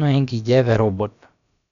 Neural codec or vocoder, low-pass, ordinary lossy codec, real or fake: codec, 16 kHz, about 1 kbps, DyCAST, with the encoder's durations; 7.2 kHz; none; fake